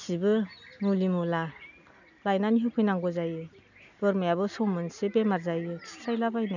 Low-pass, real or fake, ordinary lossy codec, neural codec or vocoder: 7.2 kHz; real; none; none